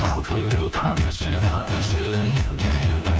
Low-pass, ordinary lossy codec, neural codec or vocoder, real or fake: none; none; codec, 16 kHz, 1 kbps, FunCodec, trained on LibriTTS, 50 frames a second; fake